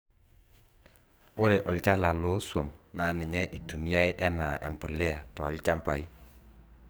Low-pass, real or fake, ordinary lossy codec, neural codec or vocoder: none; fake; none; codec, 44.1 kHz, 2.6 kbps, SNAC